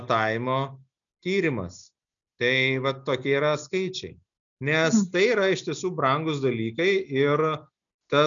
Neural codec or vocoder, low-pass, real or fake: none; 7.2 kHz; real